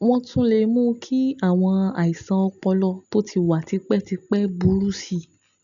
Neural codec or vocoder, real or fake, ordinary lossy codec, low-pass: none; real; none; 7.2 kHz